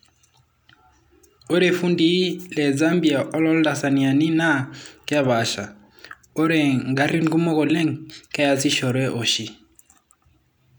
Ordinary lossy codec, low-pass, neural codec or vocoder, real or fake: none; none; none; real